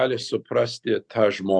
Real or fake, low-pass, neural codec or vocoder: real; 9.9 kHz; none